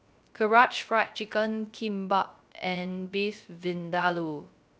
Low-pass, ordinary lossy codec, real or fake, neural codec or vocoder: none; none; fake; codec, 16 kHz, 0.3 kbps, FocalCodec